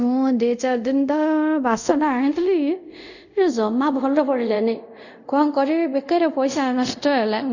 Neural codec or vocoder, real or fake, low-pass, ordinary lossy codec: codec, 24 kHz, 0.5 kbps, DualCodec; fake; 7.2 kHz; none